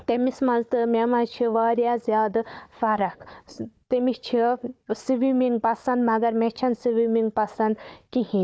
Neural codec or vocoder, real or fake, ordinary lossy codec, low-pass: codec, 16 kHz, 4 kbps, FunCodec, trained on Chinese and English, 50 frames a second; fake; none; none